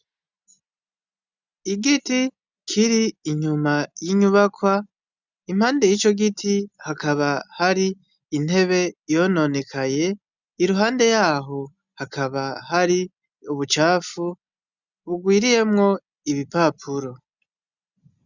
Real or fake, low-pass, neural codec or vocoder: real; 7.2 kHz; none